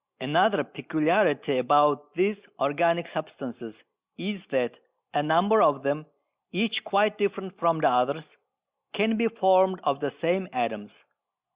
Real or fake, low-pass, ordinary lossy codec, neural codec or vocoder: real; 3.6 kHz; Opus, 64 kbps; none